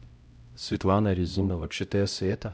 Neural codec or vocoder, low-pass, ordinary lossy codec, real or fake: codec, 16 kHz, 0.5 kbps, X-Codec, HuBERT features, trained on LibriSpeech; none; none; fake